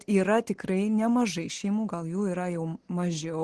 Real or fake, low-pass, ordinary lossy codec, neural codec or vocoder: real; 10.8 kHz; Opus, 16 kbps; none